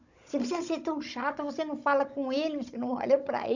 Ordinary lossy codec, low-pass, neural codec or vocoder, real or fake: none; 7.2 kHz; codec, 16 kHz, 16 kbps, FunCodec, trained on Chinese and English, 50 frames a second; fake